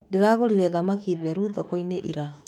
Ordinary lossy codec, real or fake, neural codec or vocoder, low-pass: MP3, 96 kbps; fake; autoencoder, 48 kHz, 32 numbers a frame, DAC-VAE, trained on Japanese speech; 19.8 kHz